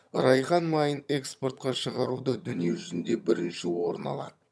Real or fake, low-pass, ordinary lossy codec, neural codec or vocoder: fake; none; none; vocoder, 22.05 kHz, 80 mel bands, HiFi-GAN